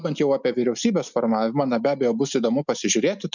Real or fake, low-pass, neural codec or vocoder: real; 7.2 kHz; none